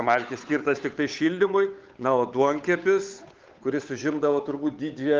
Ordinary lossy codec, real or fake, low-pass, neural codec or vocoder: Opus, 24 kbps; fake; 7.2 kHz; codec, 16 kHz, 8 kbps, FunCodec, trained on Chinese and English, 25 frames a second